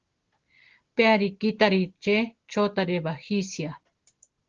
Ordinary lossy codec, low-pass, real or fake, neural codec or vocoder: Opus, 16 kbps; 7.2 kHz; real; none